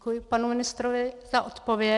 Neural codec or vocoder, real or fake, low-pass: none; real; 10.8 kHz